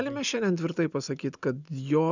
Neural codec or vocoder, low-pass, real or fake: none; 7.2 kHz; real